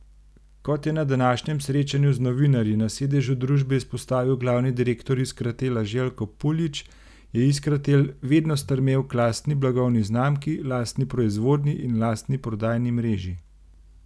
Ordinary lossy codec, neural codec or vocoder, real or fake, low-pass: none; none; real; none